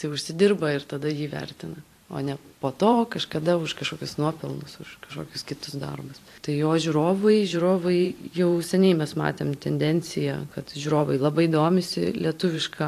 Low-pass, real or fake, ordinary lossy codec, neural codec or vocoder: 14.4 kHz; fake; AAC, 64 kbps; vocoder, 44.1 kHz, 128 mel bands every 512 samples, BigVGAN v2